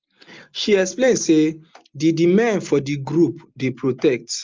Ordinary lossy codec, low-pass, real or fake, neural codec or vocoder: Opus, 32 kbps; 7.2 kHz; real; none